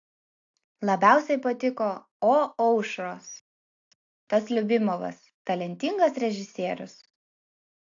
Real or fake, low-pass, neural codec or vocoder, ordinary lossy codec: real; 7.2 kHz; none; AAC, 48 kbps